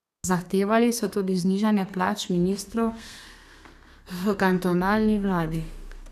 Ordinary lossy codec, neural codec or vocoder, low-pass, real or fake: none; codec, 32 kHz, 1.9 kbps, SNAC; 14.4 kHz; fake